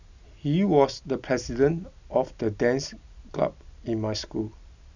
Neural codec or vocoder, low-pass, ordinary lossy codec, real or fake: none; 7.2 kHz; none; real